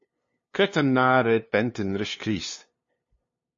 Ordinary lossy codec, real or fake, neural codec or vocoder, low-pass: MP3, 32 kbps; fake; codec, 16 kHz, 2 kbps, FunCodec, trained on LibriTTS, 25 frames a second; 7.2 kHz